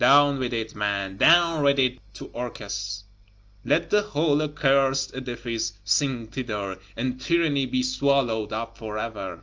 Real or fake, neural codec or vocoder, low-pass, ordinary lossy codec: real; none; 7.2 kHz; Opus, 24 kbps